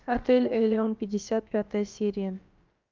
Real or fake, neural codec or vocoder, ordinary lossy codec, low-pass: fake; codec, 16 kHz, about 1 kbps, DyCAST, with the encoder's durations; Opus, 24 kbps; 7.2 kHz